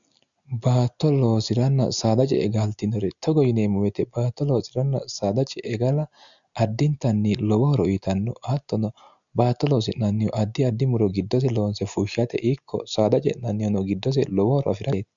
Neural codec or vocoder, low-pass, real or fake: none; 7.2 kHz; real